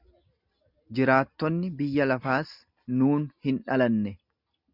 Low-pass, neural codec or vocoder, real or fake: 5.4 kHz; none; real